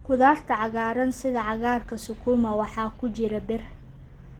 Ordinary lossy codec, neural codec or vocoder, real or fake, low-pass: Opus, 16 kbps; none; real; 19.8 kHz